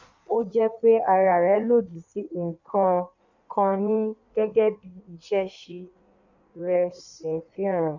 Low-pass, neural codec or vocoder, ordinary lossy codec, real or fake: 7.2 kHz; codec, 16 kHz in and 24 kHz out, 1.1 kbps, FireRedTTS-2 codec; none; fake